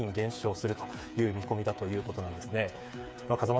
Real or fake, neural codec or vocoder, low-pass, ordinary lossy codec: fake; codec, 16 kHz, 8 kbps, FreqCodec, smaller model; none; none